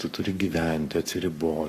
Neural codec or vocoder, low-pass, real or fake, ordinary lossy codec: codec, 44.1 kHz, 7.8 kbps, Pupu-Codec; 14.4 kHz; fake; AAC, 48 kbps